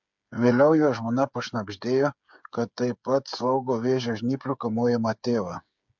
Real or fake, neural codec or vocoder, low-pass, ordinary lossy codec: fake; codec, 16 kHz, 8 kbps, FreqCodec, smaller model; 7.2 kHz; MP3, 64 kbps